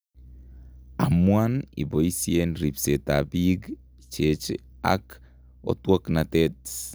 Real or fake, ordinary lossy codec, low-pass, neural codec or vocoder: real; none; none; none